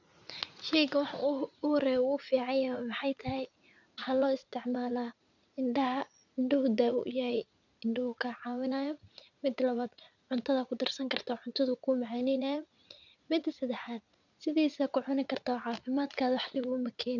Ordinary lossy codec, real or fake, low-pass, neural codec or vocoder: none; fake; 7.2 kHz; vocoder, 44.1 kHz, 80 mel bands, Vocos